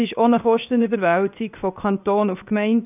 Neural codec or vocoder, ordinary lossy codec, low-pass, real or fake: codec, 16 kHz, 0.7 kbps, FocalCodec; none; 3.6 kHz; fake